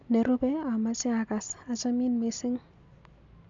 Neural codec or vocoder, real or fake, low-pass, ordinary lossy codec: none; real; 7.2 kHz; MP3, 64 kbps